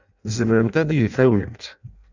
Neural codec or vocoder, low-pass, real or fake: codec, 16 kHz in and 24 kHz out, 0.6 kbps, FireRedTTS-2 codec; 7.2 kHz; fake